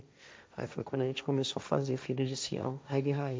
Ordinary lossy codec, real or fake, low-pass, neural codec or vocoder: none; fake; none; codec, 16 kHz, 1.1 kbps, Voila-Tokenizer